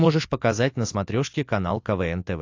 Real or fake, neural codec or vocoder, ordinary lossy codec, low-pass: real; none; AAC, 48 kbps; 7.2 kHz